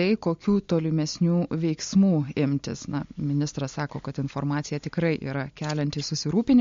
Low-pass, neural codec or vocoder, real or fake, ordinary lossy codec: 7.2 kHz; none; real; MP3, 48 kbps